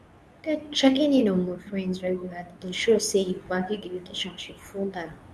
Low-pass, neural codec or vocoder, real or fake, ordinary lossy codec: none; codec, 24 kHz, 0.9 kbps, WavTokenizer, medium speech release version 2; fake; none